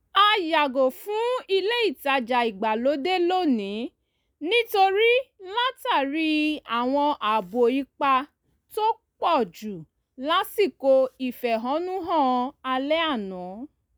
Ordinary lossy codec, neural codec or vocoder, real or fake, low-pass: none; none; real; none